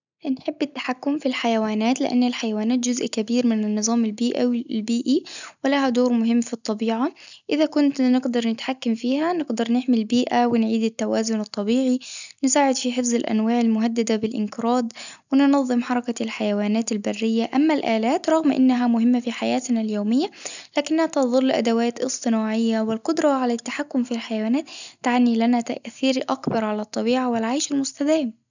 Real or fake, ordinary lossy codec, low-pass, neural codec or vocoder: real; none; 7.2 kHz; none